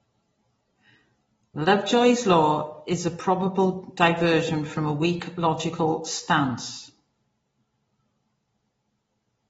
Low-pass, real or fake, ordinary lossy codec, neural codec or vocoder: 10.8 kHz; real; AAC, 24 kbps; none